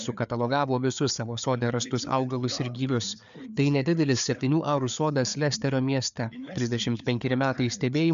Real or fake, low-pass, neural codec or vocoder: fake; 7.2 kHz; codec, 16 kHz, 4 kbps, FreqCodec, larger model